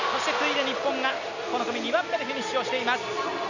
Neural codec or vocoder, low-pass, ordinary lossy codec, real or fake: none; 7.2 kHz; none; real